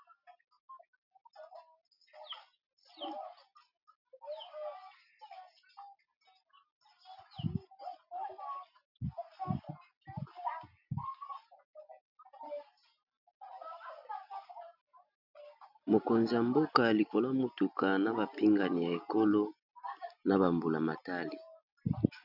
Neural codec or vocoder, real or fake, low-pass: none; real; 5.4 kHz